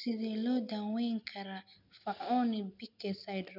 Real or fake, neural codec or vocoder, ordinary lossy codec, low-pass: real; none; none; 5.4 kHz